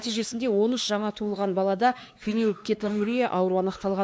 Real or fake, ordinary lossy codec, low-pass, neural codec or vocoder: fake; none; none; codec, 16 kHz, 2 kbps, X-Codec, WavLM features, trained on Multilingual LibriSpeech